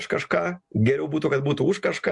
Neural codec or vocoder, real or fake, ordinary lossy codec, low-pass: none; real; MP3, 64 kbps; 10.8 kHz